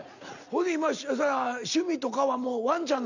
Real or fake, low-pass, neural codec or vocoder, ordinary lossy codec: real; 7.2 kHz; none; none